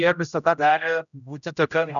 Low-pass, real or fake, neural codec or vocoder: 7.2 kHz; fake; codec, 16 kHz, 0.5 kbps, X-Codec, HuBERT features, trained on general audio